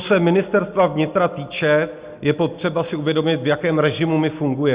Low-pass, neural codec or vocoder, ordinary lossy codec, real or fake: 3.6 kHz; none; Opus, 64 kbps; real